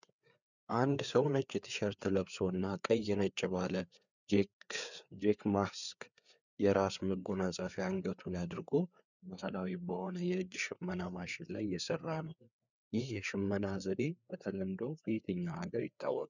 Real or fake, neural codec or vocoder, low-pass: fake; codec, 16 kHz, 4 kbps, FreqCodec, larger model; 7.2 kHz